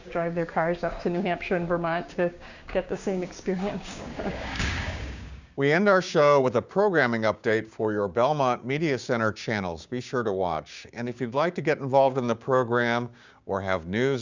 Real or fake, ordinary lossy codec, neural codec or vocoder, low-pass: fake; Opus, 64 kbps; codec, 16 kHz, 6 kbps, DAC; 7.2 kHz